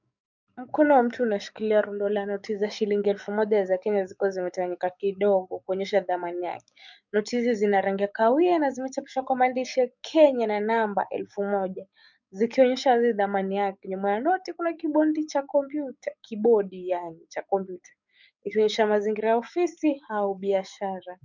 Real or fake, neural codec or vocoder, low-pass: fake; codec, 44.1 kHz, 7.8 kbps, DAC; 7.2 kHz